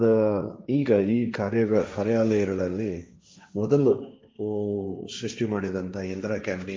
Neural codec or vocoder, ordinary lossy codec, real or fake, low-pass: codec, 16 kHz, 1.1 kbps, Voila-Tokenizer; none; fake; none